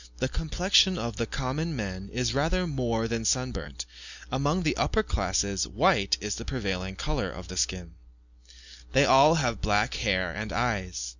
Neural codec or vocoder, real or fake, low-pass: none; real; 7.2 kHz